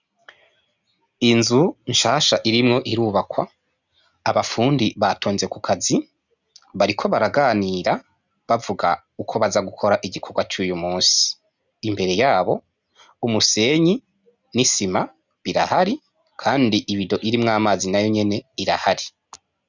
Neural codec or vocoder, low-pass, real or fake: none; 7.2 kHz; real